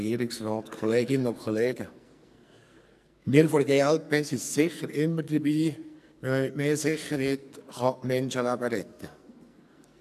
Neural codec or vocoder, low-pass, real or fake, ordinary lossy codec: codec, 44.1 kHz, 2.6 kbps, SNAC; 14.4 kHz; fake; none